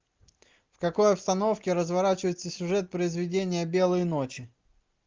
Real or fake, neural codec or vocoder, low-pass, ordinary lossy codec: real; none; 7.2 kHz; Opus, 32 kbps